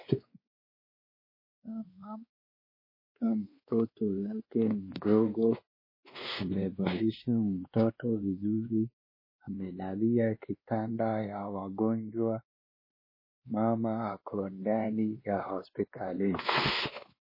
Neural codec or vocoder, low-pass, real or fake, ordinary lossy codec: codec, 16 kHz, 2 kbps, X-Codec, WavLM features, trained on Multilingual LibriSpeech; 5.4 kHz; fake; MP3, 24 kbps